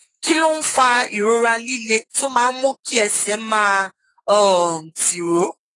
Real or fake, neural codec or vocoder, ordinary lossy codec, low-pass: fake; codec, 32 kHz, 1.9 kbps, SNAC; AAC, 32 kbps; 10.8 kHz